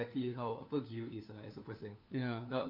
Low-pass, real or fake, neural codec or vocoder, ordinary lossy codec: 5.4 kHz; fake; codec, 16 kHz, 4 kbps, FunCodec, trained on LibriTTS, 50 frames a second; none